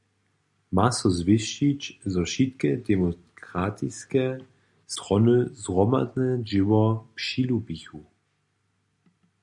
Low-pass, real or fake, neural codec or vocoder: 10.8 kHz; real; none